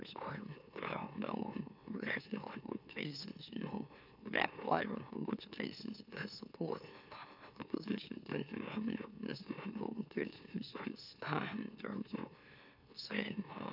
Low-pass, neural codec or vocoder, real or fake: 5.4 kHz; autoencoder, 44.1 kHz, a latent of 192 numbers a frame, MeloTTS; fake